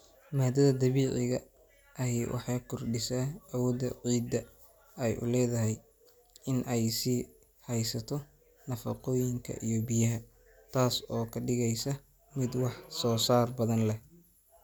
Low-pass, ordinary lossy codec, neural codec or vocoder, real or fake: none; none; none; real